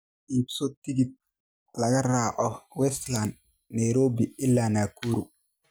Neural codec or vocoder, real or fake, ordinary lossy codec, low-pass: none; real; none; none